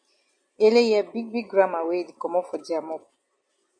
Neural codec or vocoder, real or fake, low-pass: none; real; 9.9 kHz